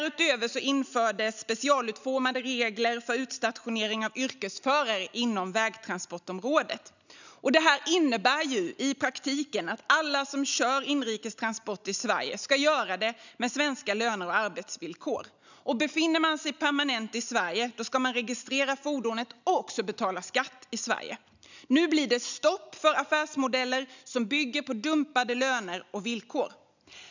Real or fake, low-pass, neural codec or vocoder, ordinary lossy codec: real; 7.2 kHz; none; none